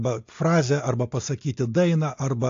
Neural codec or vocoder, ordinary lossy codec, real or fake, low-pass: none; AAC, 48 kbps; real; 7.2 kHz